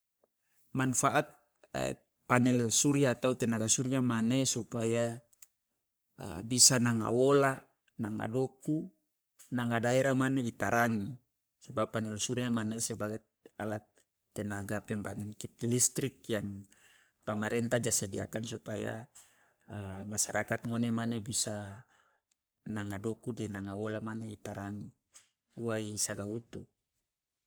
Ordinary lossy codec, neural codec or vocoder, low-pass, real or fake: none; codec, 44.1 kHz, 3.4 kbps, Pupu-Codec; none; fake